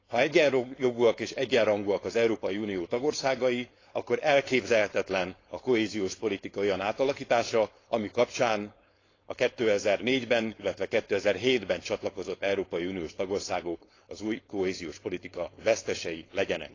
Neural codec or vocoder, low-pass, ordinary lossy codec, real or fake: codec, 16 kHz, 4.8 kbps, FACodec; 7.2 kHz; AAC, 32 kbps; fake